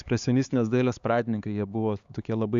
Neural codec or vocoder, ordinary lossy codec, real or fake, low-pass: codec, 16 kHz, 4 kbps, X-Codec, HuBERT features, trained on LibriSpeech; Opus, 64 kbps; fake; 7.2 kHz